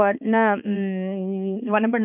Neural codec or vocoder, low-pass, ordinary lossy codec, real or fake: codec, 16 kHz, 2 kbps, X-Codec, HuBERT features, trained on balanced general audio; 3.6 kHz; none; fake